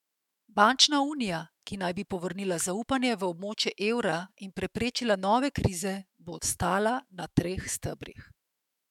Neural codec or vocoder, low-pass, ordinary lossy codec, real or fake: autoencoder, 48 kHz, 128 numbers a frame, DAC-VAE, trained on Japanese speech; 19.8 kHz; MP3, 96 kbps; fake